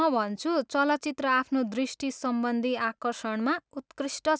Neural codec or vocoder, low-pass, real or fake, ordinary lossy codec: none; none; real; none